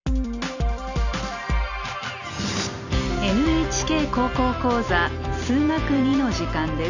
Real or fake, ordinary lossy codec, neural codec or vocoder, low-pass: real; none; none; 7.2 kHz